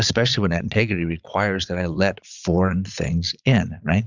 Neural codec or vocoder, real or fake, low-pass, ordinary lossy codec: vocoder, 22.05 kHz, 80 mel bands, Vocos; fake; 7.2 kHz; Opus, 64 kbps